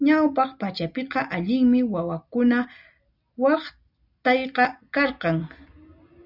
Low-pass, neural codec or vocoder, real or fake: 5.4 kHz; none; real